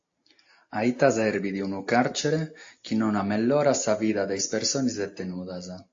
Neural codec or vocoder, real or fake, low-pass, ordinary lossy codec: none; real; 7.2 kHz; AAC, 48 kbps